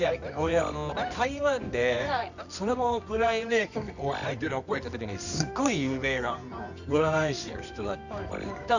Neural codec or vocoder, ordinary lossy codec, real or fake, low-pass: codec, 24 kHz, 0.9 kbps, WavTokenizer, medium music audio release; none; fake; 7.2 kHz